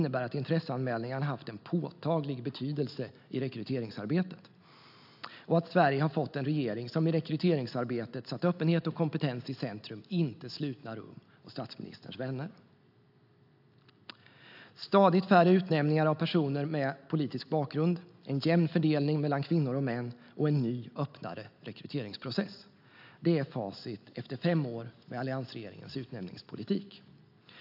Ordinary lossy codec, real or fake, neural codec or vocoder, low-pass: AAC, 48 kbps; real; none; 5.4 kHz